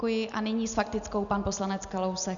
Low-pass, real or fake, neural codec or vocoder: 7.2 kHz; real; none